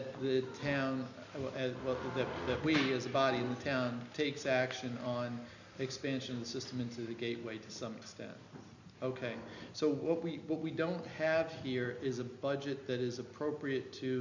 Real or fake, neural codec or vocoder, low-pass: real; none; 7.2 kHz